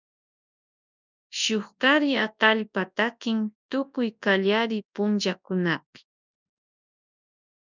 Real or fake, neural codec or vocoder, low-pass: fake; codec, 24 kHz, 0.9 kbps, WavTokenizer, large speech release; 7.2 kHz